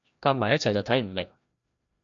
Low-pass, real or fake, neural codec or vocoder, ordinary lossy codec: 7.2 kHz; fake; codec, 16 kHz, 1 kbps, FreqCodec, larger model; AAC, 64 kbps